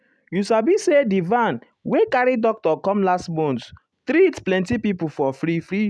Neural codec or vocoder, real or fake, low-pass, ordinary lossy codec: none; real; none; none